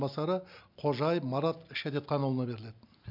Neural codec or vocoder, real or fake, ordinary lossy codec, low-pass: none; real; none; 5.4 kHz